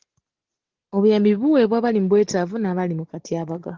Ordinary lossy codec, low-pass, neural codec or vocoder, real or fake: Opus, 16 kbps; 7.2 kHz; none; real